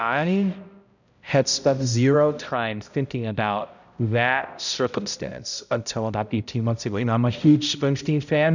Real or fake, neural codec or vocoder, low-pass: fake; codec, 16 kHz, 0.5 kbps, X-Codec, HuBERT features, trained on balanced general audio; 7.2 kHz